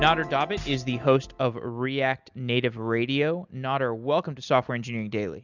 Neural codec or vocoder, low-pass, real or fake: none; 7.2 kHz; real